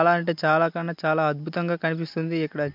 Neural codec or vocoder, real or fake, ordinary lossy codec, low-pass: none; real; MP3, 48 kbps; 5.4 kHz